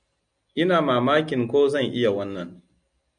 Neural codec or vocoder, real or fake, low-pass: none; real; 9.9 kHz